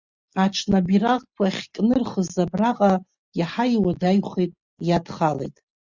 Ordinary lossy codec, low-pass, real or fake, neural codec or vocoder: Opus, 64 kbps; 7.2 kHz; real; none